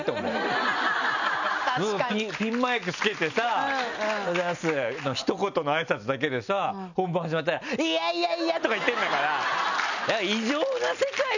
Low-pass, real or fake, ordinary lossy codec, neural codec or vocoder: 7.2 kHz; real; none; none